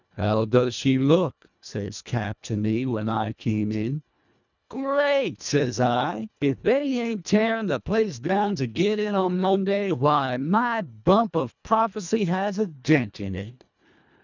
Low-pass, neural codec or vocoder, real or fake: 7.2 kHz; codec, 24 kHz, 1.5 kbps, HILCodec; fake